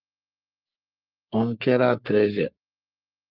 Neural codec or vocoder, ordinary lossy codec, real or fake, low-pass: codec, 44.1 kHz, 3.4 kbps, Pupu-Codec; Opus, 32 kbps; fake; 5.4 kHz